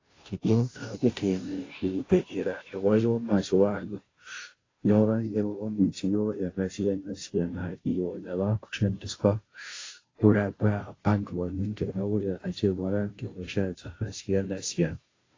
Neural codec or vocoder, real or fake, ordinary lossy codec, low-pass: codec, 16 kHz, 0.5 kbps, FunCodec, trained on Chinese and English, 25 frames a second; fake; AAC, 32 kbps; 7.2 kHz